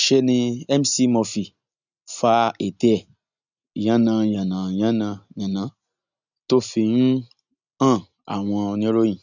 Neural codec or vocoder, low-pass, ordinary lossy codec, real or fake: none; 7.2 kHz; none; real